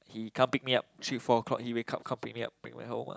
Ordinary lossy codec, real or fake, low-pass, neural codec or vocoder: none; real; none; none